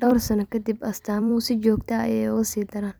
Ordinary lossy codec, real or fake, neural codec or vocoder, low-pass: none; fake; vocoder, 44.1 kHz, 128 mel bands every 256 samples, BigVGAN v2; none